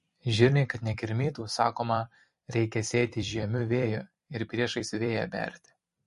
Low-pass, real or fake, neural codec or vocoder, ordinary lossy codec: 14.4 kHz; fake; vocoder, 44.1 kHz, 128 mel bands every 256 samples, BigVGAN v2; MP3, 48 kbps